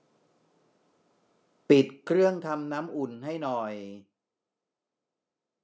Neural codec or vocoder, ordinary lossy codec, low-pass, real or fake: none; none; none; real